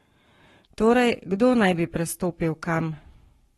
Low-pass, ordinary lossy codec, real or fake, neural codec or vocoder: 19.8 kHz; AAC, 32 kbps; fake; codec, 44.1 kHz, 7.8 kbps, Pupu-Codec